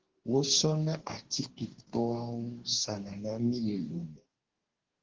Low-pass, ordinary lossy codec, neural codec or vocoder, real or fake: 7.2 kHz; Opus, 16 kbps; codec, 44.1 kHz, 2.6 kbps, DAC; fake